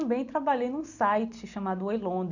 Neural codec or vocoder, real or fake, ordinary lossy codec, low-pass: none; real; none; 7.2 kHz